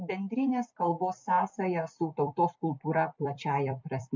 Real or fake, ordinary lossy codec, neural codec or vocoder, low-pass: fake; MP3, 64 kbps; vocoder, 44.1 kHz, 128 mel bands every 256 samples, BigVGAN v2; 7.2 kHz